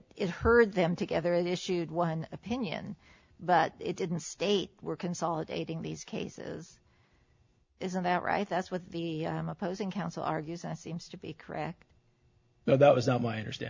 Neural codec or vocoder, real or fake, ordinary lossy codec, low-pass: none; real; MP3, 64 kbps; 7.2 kHz